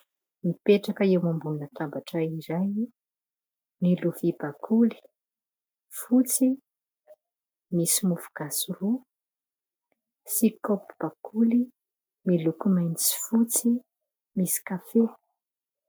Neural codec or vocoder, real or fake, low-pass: none; real; 19.8 kHz